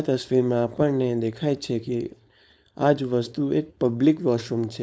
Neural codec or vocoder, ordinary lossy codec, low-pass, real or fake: codec, 16 kHz, 4.8 kbps, FACodec; none; none; fake